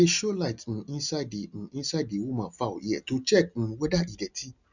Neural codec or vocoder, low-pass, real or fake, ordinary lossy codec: none; 7.2 kHz; real; none